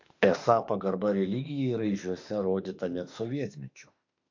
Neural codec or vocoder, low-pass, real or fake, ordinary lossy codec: autoencoder, 48 kHz, 32 numbers a frame, DAC-VAE, trained on Japanese speech; 7.2 kHz; fake; AAC, 48 kbps